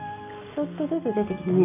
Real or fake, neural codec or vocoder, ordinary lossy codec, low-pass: real; none; none; 3.6 kHz